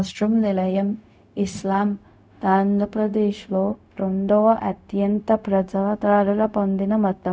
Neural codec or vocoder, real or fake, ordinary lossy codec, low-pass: codec, 16 kHz, 0.4 kbps, LongCat-Audio-Codec; fake; none; none